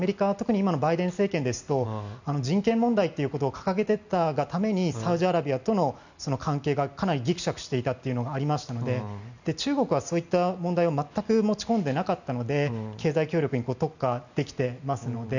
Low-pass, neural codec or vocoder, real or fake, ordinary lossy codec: 7.2 kHz; none; real; none